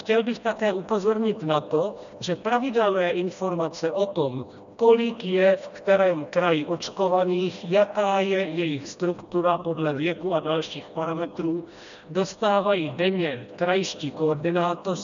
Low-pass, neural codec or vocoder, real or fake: 7.2 kHz; codec, 16 kHz, 1 kbps, FreqCodec, smaller model; fake